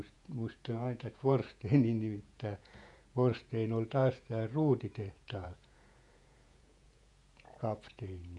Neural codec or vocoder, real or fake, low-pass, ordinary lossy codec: vocoder, 48 kHz, 128 mel bands, Vocos; fake; 10.8 kHz; none